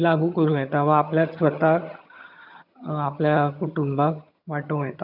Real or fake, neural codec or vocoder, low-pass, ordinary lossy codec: fake; vocoder, 22.05 kHz, 80 mel bands, HiFi-GAN; 5.4 kHz; none